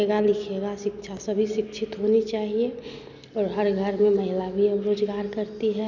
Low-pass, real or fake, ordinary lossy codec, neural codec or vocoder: 7.2 kHz; real; none; none